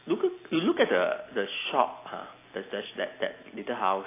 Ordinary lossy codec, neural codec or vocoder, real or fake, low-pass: AAC, 24 kbps; none; real; 3.6 kHz